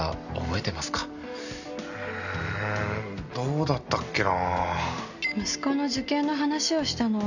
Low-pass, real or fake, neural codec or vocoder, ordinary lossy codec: 7.2 kHz; real; none; none